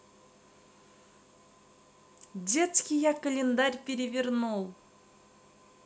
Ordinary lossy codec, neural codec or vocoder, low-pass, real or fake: none; none; none; real